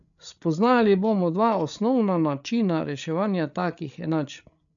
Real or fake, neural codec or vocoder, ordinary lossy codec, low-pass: fake; codec, 16 kHz, 8 kbps, FreqCodec, larger model; none; 7.2 kHz